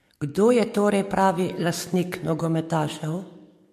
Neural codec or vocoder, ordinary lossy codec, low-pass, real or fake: codec, 44.1 kHz, 7.8 kbps, DAC; MP3, 64 kbps; 14.4 kHz; fake